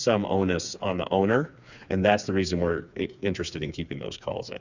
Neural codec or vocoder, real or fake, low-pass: codec, 16 kHz, 4 kbps, FreqCodec, smaller model; fake; 7.2 kHz